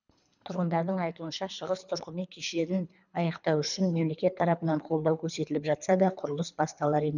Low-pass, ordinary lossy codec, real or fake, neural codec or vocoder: 7.2 kHz; none; fake; codec, 24 kHz, 3 kbps, HILCodec